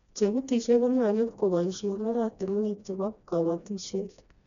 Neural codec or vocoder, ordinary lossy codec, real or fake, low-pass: codec, 16 kHz, 1 kbps, FreqCodec, smaller model; none; fake; 7.2 kHz